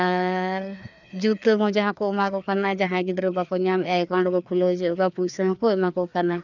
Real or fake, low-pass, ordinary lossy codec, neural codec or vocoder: fake; 7.2 kHz; none; codec, 16 kHz, 2 kbps, FreqCodec, larger model